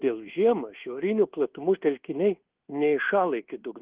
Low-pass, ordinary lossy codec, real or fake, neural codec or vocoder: 3.6 kHz; Opus, 16 kbps; fake; codec, 24 kHz, 1.2 kbps, DualCodec